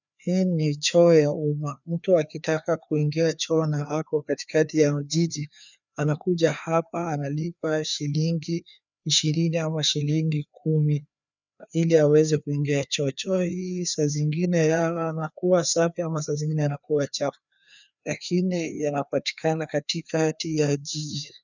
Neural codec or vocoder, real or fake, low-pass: codec, 16 kHz, 2 kbps, FreqCodec, larger model; fake; 7.2 kHz